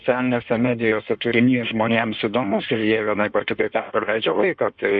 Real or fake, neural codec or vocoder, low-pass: fake; codec, 16 kHz in and 24 kHz out, 1.1 kbps, FireRedTTS-2 codec; 9.9 kHz